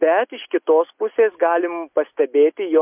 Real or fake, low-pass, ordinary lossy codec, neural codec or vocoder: real; 3.6 kHz; MP3, 32 kbps; none